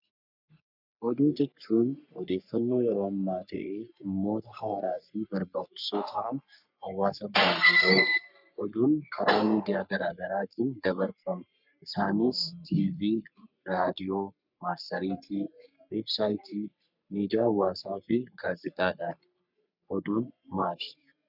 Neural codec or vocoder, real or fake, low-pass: codec, 44.1 kHz, 3.4 kbps, Pupu-Codec; fake; 5.4 kHz